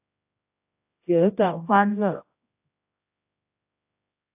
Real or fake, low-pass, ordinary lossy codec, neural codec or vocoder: fake; 3.6 kHz; AAC, 32 kbps; codec, 16 kHz, 0.5 kbps, X-Codec, HuBERT features, trained on general audio